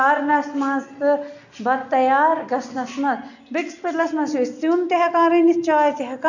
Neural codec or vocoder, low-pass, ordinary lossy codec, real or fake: none; 7.2 kHz; none; real